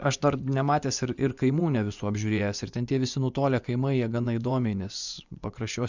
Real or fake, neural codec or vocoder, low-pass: fake; vocoder, 24 kHz, 100 mel bands, Vocos; 7.2 kHz